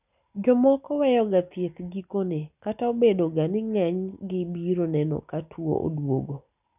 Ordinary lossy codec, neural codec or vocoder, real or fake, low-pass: none; none; real; 3.6 kHz